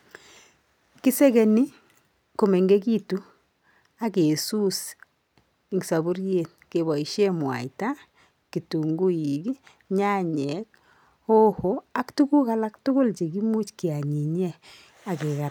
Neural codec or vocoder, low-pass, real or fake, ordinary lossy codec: none; none; real; none